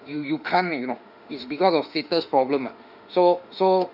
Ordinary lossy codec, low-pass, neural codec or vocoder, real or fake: none; 5.4 kHz; autoencoder, 48 kHz, 32 numbers a frame, DAC-VAE, trained on Japanese speech; fake